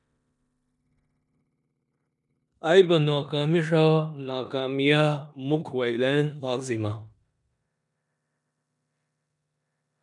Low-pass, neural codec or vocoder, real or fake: 10.8 kHz; codec, 16 kHz in and 24 kHz out, 0.9 kbps, LongCat-Audio-Codec, four codebook decoder; fake